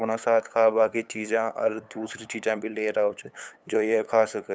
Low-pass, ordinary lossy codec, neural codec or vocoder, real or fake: none; none; codec, 16 kHz, 8 kbps, FunCodec, trained on LibriTTS, 25 frames a second; fake